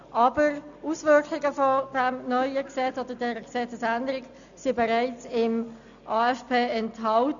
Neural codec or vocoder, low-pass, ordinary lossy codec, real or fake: none; 7.2 kHz; none; real